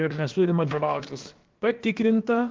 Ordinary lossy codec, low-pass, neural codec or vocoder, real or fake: Opus, 16 kbps; 7.2 kHz; codec, 16 kHz, about 1 kbps, DyCAST, with the encoder's durations; fake